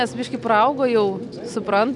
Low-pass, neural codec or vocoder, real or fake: 10.8 kHz; none; real